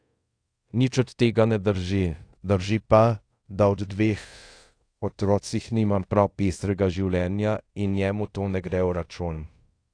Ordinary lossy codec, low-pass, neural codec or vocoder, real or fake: AAC, 48 kbps; 9.9 kHz; codec, 24 kHz, 0.5 kbps, DualCodec; fake